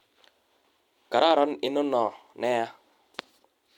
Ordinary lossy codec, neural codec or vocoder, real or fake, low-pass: MP3, 96 kbps; none; real; 19.8 kHz